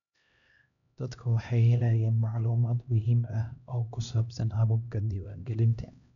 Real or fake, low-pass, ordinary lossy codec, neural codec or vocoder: fake; 7.2 kHz; none; codec, 16 kHz, 1 kbps, X-Codec, HuBERT features, trained on LibriSpeech